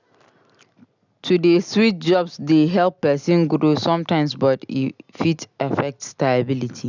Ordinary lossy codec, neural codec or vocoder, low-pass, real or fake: none; none; 7.2 kHz; real